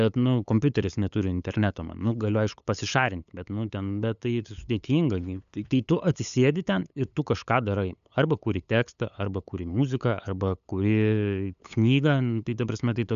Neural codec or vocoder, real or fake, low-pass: codec, 16 kHz, 8 kbps, FunCodec, trained on LibriTTS, 25 frames a second; fake; 7.2 kHz